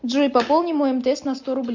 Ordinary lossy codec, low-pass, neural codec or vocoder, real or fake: MP3, 64 kbps; 7.2 kHz; none; real